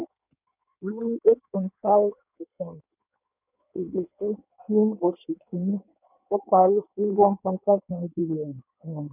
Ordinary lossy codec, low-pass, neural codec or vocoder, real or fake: none; 3.6 kHz; codec, 16 kHz in and 24 kHz out, 1.1 kbps, FireRedTTS-2 codec; fake